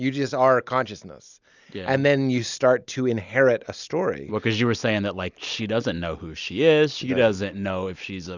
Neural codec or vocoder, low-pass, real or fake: none; 7.2 kHz; real